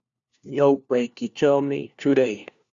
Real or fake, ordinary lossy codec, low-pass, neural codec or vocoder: fake; Opus, 64 kbps; 7.2 kHz; codec, 16 kHz, 1 kbps, FunCodec, trained on LibriTTS, 50 frames a second